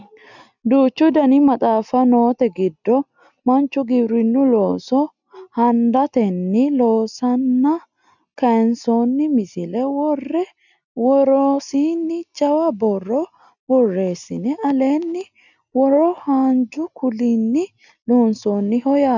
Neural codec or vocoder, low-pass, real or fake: none; 7.2 kHz; real